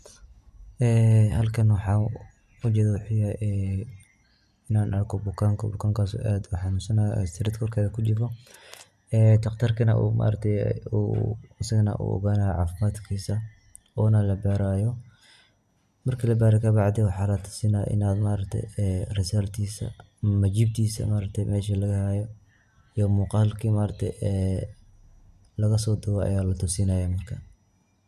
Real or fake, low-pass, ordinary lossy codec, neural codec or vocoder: real; 14.4 kHz; none; none